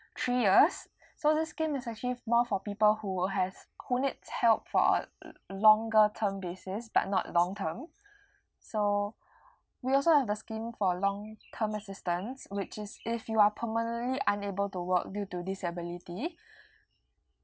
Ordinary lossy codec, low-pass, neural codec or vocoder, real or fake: none; none; none; real